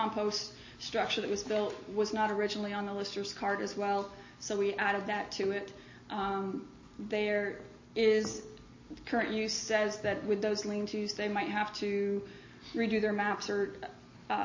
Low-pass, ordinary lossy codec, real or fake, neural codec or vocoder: 7.2 kHz; MP3, 32 kbps; real; none